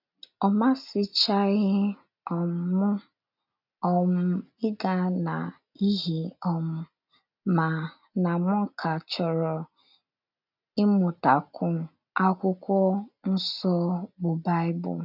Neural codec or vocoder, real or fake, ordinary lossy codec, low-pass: none; real; none; 5.4 kHz